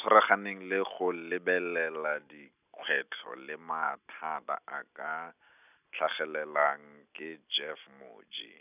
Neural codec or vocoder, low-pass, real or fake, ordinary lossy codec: none; 3.6 kHz; real; none